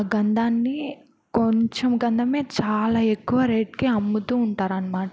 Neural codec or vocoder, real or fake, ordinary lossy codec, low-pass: none; real; none; none